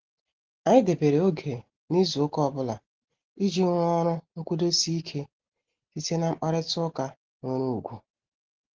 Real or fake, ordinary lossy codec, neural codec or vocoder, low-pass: real; Opus, 16 kbps; none; 7.2 kHz